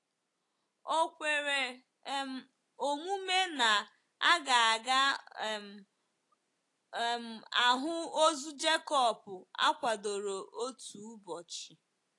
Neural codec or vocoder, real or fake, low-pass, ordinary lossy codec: none; real; 10.8 kHz; AAC, 48 kbps